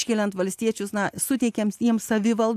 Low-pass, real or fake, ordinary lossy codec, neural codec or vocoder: 14.4 kHz; real; AAC, 96 kbps; none